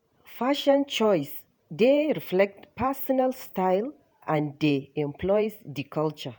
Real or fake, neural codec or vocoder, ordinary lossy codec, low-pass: fake; vocoder, 48 kHz, 128 mel bands, Vocos; none; none